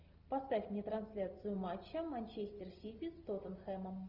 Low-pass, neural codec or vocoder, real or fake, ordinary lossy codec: 5.4 kHz; none; real; Opus, 24 kbps